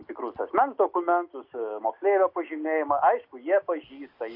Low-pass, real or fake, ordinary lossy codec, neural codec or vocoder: 5.4 kHz; real; Opus, 64 kbps; none